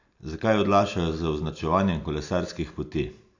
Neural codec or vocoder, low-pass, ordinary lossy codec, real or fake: none; 7.2 kHz; AAC, 48 kbps; real